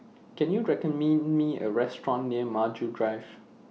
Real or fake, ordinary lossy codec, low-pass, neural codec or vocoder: real; none; none; none